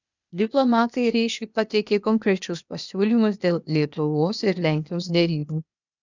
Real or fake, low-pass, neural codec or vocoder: fake; 7.2 kHz; codec, 16 kHz, 0.8 kbps, ZipCodec